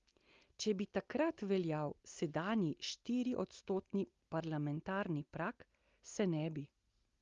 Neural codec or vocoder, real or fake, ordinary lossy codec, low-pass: none; real; Opus, 32 kbps; 7.2 kHz